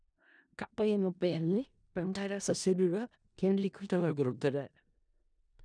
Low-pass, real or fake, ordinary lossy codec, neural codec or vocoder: 9.9 kHz; fake; none; codec, 16 kHz in and 24 kHz out, 0.4 kbps, LongCat-Audio-Codec, four codebook decoder